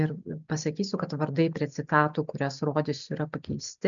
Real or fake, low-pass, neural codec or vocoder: real; 7.2 kHz; none